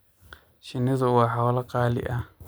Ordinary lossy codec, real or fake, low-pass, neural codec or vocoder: none; real; none; none